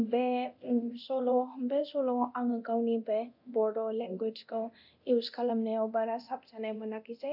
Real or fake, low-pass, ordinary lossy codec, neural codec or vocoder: fake; 5.4 kHz; none; codec, 24 kHz, 0.9 kbps, DualCodec